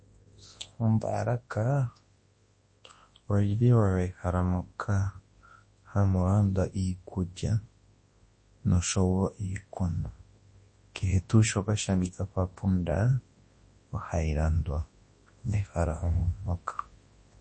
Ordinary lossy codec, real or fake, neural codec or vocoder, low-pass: MP3, 32 kbps; fake; codec, 24 kHz, 0.9 kbps, WavTokenizer, large speech release; 9.9 kHz